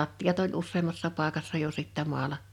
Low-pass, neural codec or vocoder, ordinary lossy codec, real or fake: 19.8 kHz; none; none; real